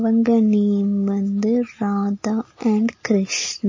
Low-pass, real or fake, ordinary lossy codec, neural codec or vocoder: 7.2 kHz; real; MP3, 32 kbps; none